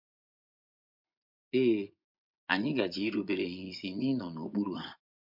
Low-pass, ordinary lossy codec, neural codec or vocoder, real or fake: 5.4 kHz; MP3, 48 kbps; vocoder, 24 kHz, 100 mel bands, Vocos; fake